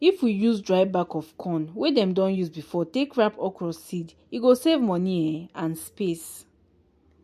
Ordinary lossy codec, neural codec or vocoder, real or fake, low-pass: MP3, 64 kbps; none; real; 14.4 kHz